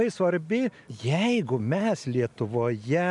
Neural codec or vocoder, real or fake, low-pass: none; real; 10.8 kHz